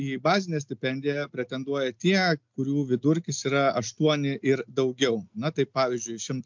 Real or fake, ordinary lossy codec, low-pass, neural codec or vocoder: real; MP3, 64 kbps; 7.2 kHz; none